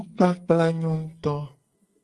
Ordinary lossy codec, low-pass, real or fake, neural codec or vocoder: Opus, 24 kbps; 10.8 kHz; fake; codec, 44.1 kHz, 2.6 kbps, SNAC